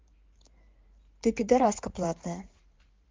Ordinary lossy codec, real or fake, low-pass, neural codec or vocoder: Opus, 32 kbps; fake; 7.2 kHz; codec, 16 kHz in and 24 kHz out, 2.2 kbps, FireRedTTS-2 codec